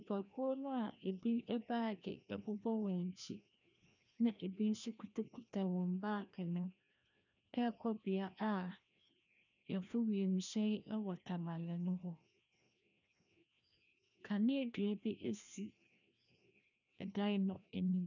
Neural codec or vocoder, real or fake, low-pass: codec, 16 kHz, 1 kbps, FreqCodec, larger model; fake; 7.2 kHz